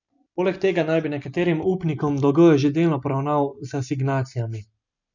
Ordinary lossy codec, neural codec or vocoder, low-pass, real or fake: none; none; 7.2 kHz; real